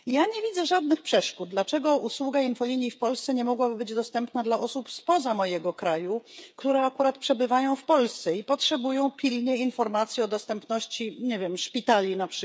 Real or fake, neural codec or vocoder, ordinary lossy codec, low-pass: fake; codec, 16 kHz, 8 kbps, FreqCodec, smaller model; none; none